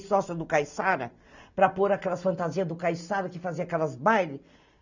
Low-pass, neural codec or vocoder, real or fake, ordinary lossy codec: 7.2 kHz; none; real; none